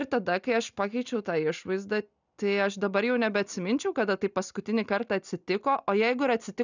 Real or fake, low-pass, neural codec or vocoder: real; 7.2 kHz; none